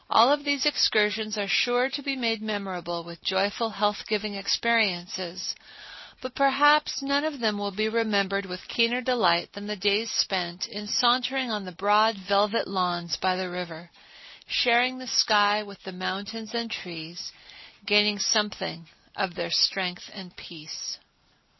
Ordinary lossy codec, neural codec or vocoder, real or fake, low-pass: MP3, 24 kbps; none; real; 7.2 kHz